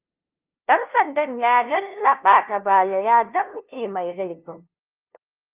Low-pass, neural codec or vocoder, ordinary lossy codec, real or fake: 3.6 kHz; codec, 16 kHz, 0.5 kbps, FunCodec, trained on LibriTTS, 25 frames a second; Opus, 24 kbps; fake